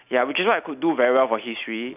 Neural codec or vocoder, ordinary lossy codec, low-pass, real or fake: none; none; 3.6 kHz; real